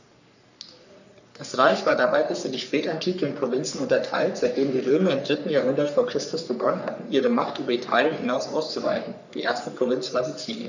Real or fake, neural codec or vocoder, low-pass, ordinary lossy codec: fake; codec, 44.1 kHz, 3.4 kbps, Pupu-Codec; 7.2 kHz; none